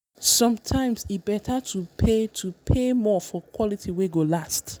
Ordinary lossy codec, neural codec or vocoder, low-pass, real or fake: none; none; none; real